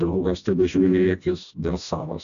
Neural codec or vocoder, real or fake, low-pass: codec, 16 kHz, 1 kbps, FreqCodec, smaller model; fake; 7.2 kHz